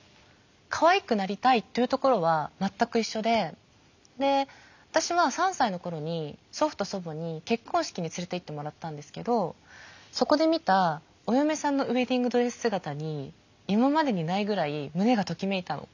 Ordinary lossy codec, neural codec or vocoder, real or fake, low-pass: none; none; real; 7.2 kHz